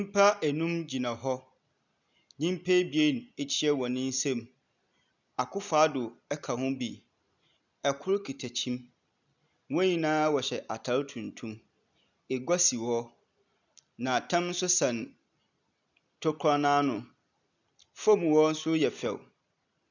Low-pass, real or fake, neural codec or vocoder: 7.2 kHz; real; none